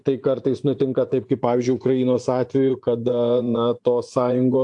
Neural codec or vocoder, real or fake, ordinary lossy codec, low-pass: vocoder, 24 kHz, 100 mel bands, Vocos; fake; AAC, 64 kbps; 10.8 kHz